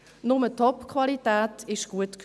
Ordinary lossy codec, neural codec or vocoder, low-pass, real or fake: none; none; none; real